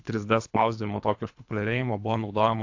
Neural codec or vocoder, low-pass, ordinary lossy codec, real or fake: codec, 24 kHz, 3 kbps, HILCodec; 7.2 kHz; AAC, 48 kbps; fake